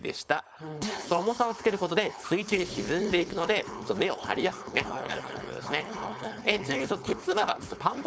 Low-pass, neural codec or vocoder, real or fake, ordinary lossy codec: none; codec, 16 kHz, 4.8 kbps, FACodec; fake; none